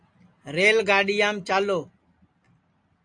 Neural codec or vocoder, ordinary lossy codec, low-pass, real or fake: none; AAC, 64 kbps; 9.9 kHz; real